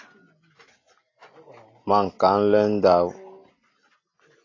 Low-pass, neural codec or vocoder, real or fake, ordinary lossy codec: 7.2 kHz; none; real; AAC, 32 kbps